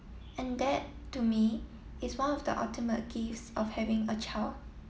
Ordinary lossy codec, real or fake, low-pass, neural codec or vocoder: none; real; none; none